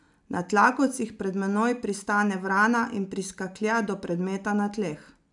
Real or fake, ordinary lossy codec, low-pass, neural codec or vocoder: real; none; 10.8 kHz; none